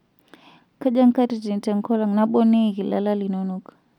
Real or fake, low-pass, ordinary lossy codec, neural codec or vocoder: real; 19.8 kHz; none; none